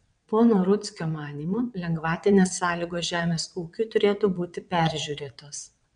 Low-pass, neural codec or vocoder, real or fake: 9.9 kHz; vocoder, 22.05 kHz, 80 mel bands, WaveNeXt; fake